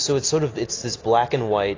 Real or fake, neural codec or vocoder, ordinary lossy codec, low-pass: real; none; AAC, 32 kbps; 7.2 kHz